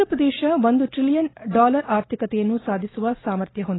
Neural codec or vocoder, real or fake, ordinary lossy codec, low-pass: none; real; AAC, 16 kbps; 7.2 kHz